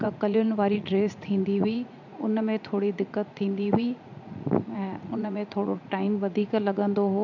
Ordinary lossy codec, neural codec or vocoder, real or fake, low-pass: none; vocoder, 44.1 kHz, 80 mel bands, Vocos; fake; 7.2 kHz